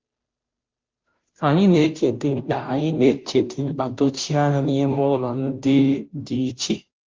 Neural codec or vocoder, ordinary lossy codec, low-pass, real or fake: codec, 16 kHz, 0.5 kbps, FunCodec, trained on Chinese and English, 25 frames a second; Opus, 24 kbps; 7.2 kHz; fake